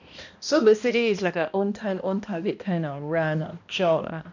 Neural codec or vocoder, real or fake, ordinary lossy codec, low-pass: codec, 16 kHz, 1 kbps, X-Codec, HuBERT features, trained on balanced general audio; fake; none; 7.2 kHz